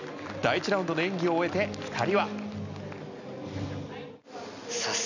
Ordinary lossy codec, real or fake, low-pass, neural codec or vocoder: none; real; 7.2 kHz; none